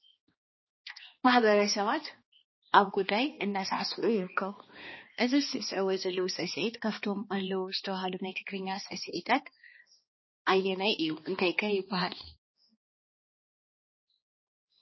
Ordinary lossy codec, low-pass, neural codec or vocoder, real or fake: MP3, 24 kbps; 7.2 kHz; codec, 16 kHz, 2 kbps, X-Codec, HuBERT features, trained on balanced general audio; fake